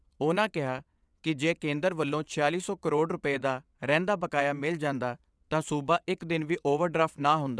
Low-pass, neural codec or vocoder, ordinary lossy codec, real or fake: none; vocoder, 22.05 kHz, 80 mel bands, WaveNeXt; none; fake